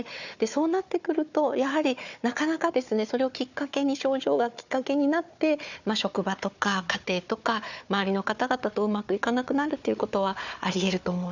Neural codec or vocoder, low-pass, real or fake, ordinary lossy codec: codec, 16 kHz, 4 kbps, FunCodec, trained on Chinese and English, 50 frames a second; 7.2 kHz; fake; none